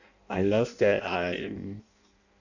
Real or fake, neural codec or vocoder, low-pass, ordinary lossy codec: fake; codec, 16 kHz in and 24 kHz out, 0.6 kbps, FireRedTTS-2 codec; 7.2 kHz; none